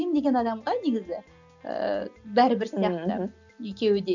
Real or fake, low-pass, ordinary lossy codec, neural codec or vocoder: real; 7.2 kHz; none; none